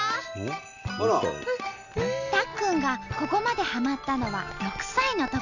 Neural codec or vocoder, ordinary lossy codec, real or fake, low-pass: none; none; real; 7.2 kHz